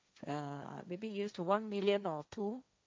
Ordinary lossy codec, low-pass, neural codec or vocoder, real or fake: none; none; codec, 16 kHz, 1.1 kbps, Voila-Tokenizer; fake